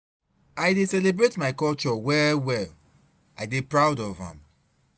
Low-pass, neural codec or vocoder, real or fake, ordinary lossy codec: none; none; real; none